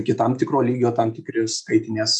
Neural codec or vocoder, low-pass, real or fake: none; 10.8 kHz; real